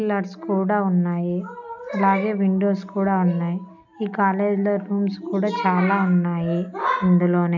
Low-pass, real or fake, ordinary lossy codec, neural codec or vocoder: 7.2 kHz; real; none; none